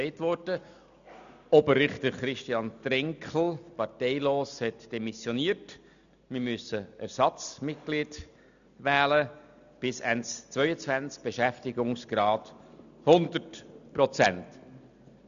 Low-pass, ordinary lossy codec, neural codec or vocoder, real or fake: 7.2 kHz; none; none; real